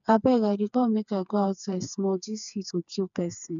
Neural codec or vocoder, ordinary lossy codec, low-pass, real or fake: codec, 16 kHz, 4 kbps, FreqCodec, smaller model; none; 7.2 kHz; fake